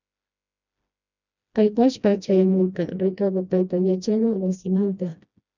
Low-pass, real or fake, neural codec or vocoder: 7.2 kHz; fake; codec, 16 kHz, 1 kbps, FreqCodec, smaller model